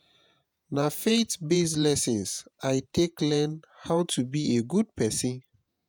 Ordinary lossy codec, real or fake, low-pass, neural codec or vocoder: none; fake; none; vocoder, 48 kHz, 128 mel bands, Vocos